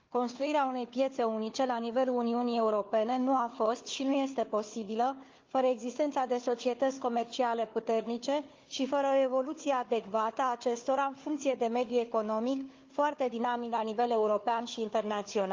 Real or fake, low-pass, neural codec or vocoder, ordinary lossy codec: fake; 7.2 kHz; codec, 16 kHz, 4 kbps, FunCodec, trained on Chinese and English, 50 frames a second; Opus, 24 kbps